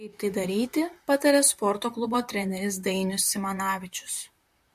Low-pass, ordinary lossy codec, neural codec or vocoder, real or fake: 14.4 kHz; MP3, 64 kbps; vocoder, 44.1 kHz, 128 mel bands, Pupu-Vocoder; fake